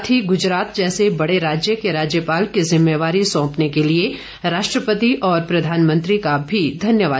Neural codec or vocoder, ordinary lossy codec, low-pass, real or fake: none; none; none; real